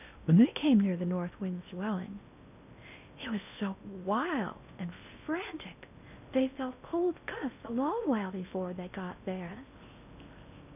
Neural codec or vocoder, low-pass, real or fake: codec, 16 kHz in and 24 kHz out, 0.6 kbps, FocalCodec, streaming, 2048 codes; 3.6 kHz; fake